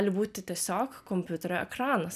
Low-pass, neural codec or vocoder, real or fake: 14.4 kHz; none; real